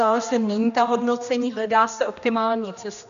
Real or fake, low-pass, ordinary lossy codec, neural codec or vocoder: fake; 7.2 kHz; AAC, 96 kbps; codec, 16 kHz, 1 kbps, X-Codec, HuBERT features, trained on general audio